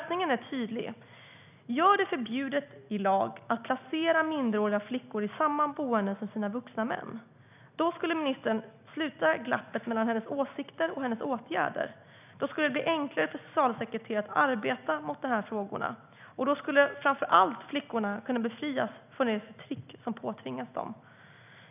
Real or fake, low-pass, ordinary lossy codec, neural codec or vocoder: real; 3.6 kHz; none; none